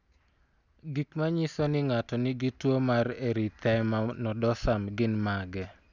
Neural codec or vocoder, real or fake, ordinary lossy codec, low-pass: none; real; none; 7.2 kHz